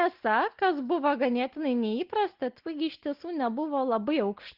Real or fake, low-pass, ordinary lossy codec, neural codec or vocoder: real; 5.4 kHz; Opus, 16 kbps; none